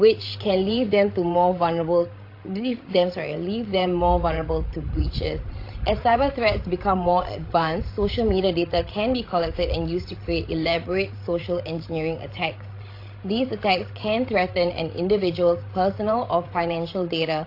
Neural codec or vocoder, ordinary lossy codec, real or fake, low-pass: codec, 16 kHz, 16 kbps, FreqCodec, larger model; AAC, 32 kbps; fake; 5.4 kHz